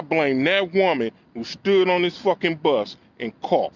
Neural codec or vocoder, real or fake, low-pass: none; real; 7.2 kHz